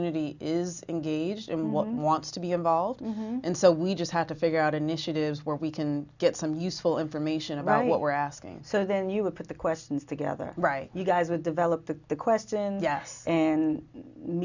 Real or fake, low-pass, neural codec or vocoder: real; 7.2 kHz; none